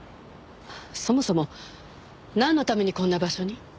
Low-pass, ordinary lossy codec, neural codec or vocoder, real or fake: none; none; none; real